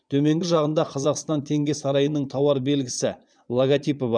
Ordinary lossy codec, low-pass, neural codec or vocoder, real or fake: none; 9.9 kHz; vocoder, 22.05 kHz, 80 mel bands, Vocos; fake